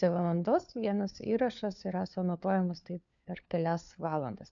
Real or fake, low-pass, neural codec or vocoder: fake; 7.2 kHz; codec, 16 kHz, 4 kbps, FunCodec, trained on LibriTTS, 50 frames a second